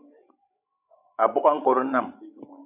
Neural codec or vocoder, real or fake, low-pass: codec, 16 kHz, 16 kbps, FreqCodec, larger model; fake; 3.6 kHz